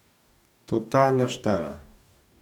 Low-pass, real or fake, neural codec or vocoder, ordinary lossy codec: 19.8 kHz; fake; codec, 44.1 kHz, 2.6 kbps, DAC; none